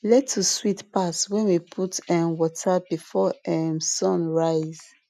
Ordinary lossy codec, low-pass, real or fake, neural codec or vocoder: none; 14.4 kHz; real; none